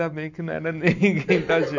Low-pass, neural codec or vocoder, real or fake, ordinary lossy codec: 7.2 kHz; none; real; none